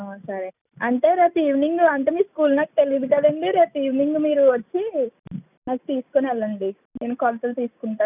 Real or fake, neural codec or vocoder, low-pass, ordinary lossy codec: real; none; 3.6 kHz; none